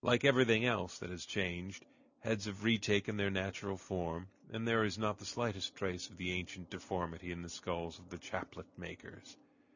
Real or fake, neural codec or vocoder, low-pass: real; none; 7.2 kHz